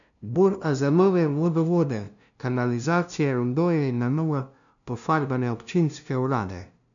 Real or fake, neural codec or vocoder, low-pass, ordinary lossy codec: fake; codec, 16 kHz, 0.5 kbps, FunCodec, trained on LibriTTS, 25 frames a second; 7.2 kHz; none